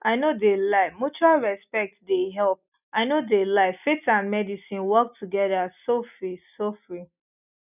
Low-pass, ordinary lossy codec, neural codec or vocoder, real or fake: 3.6 kHz; none; none; real